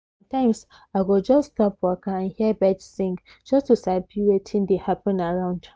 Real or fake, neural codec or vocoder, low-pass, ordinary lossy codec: real; none; none; none